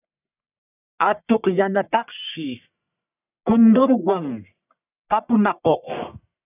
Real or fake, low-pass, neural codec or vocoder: fake; 3.6 kHz; codec, 44.1 kHz, 1.7 kbps, Pupu-Codec